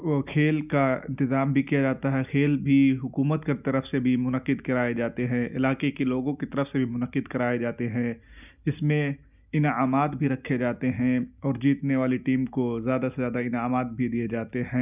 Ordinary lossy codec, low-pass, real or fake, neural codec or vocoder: none; 3.6 kHz; real; none